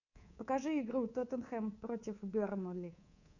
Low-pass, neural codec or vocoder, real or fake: 7.2 kHz; codec, 24 kHz, 3.1 kbps, DualCodec; fake